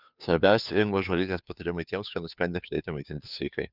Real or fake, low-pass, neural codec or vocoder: fake; 5.4 kHz; codec, 16 kHz, 2 kbps, FunCodec, trained on Chinese and English, 25 frames a second